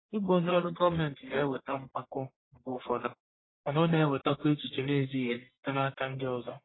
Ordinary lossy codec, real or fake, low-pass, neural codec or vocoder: AAC, 16 kbps; fake; 7.2 kHz; codec, 44.1 kHz, 1.7 kbps, Pupu-Codec